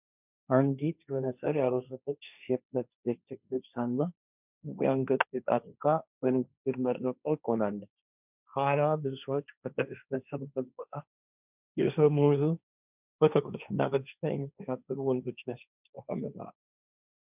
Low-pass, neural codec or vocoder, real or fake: 3.6 kHz; codec, 16 kHz, 1.1 kbps, Voila-Tokenizer; fake